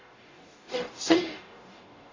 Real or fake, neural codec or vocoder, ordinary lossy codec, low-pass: fake; codec, 44.1 kHz, 0.9 kbps, DAC; AAC, 32 kbps; 7.2 kHz